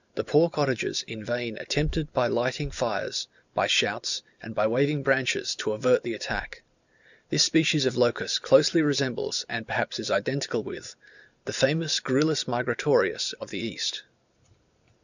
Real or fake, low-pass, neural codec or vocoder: fake; 7.2 kHz; vocoder, 44.1 kHz, 128 mel bands every 512 samples, BigVGAN v2